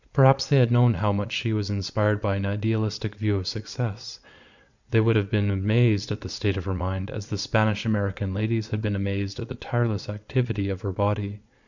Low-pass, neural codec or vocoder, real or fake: 7.2 kHz; vocoder, 22.05 kHz, 80 mel bands, Vocos; fake